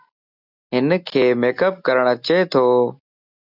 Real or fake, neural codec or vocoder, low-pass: real; none; 5.4 kHz